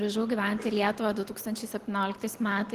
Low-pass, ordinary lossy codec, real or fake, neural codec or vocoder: 14.4 kHz; Opus, 16 kbps; real; none